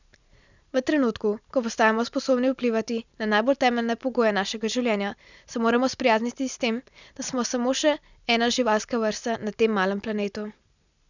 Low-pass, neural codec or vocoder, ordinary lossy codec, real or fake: 7.2 kHz; none; none; real